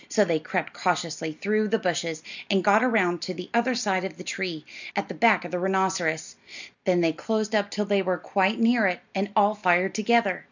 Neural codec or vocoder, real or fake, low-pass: none; real; 7.2 kHz